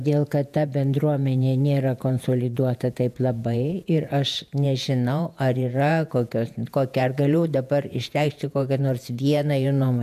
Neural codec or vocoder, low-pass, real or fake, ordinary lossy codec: vocoder, 48 kHz, 128 mel bands, Vocos; 14.4 kHz; fake; MP3, 96 kbps